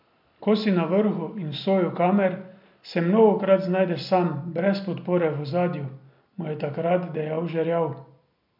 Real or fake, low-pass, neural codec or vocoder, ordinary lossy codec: real; 5.4 kHz; none; MP3, 48 kbps